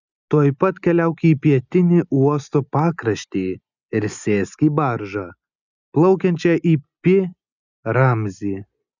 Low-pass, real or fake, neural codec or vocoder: 7.2 kHz; real; none